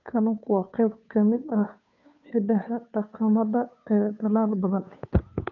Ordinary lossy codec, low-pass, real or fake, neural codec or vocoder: none; 7.2 kHz; fake; codec, 16 kHz, 2 kbps, FunCodec, trained on Chinese and English, 25 frames a second